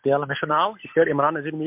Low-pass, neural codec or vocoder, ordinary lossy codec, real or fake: 3.6 kHz; none; MP3, 32 kbps; real